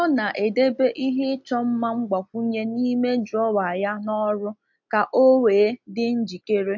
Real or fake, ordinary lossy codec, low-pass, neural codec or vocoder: real; MP3, 48 kbps; 7.2 kHz; none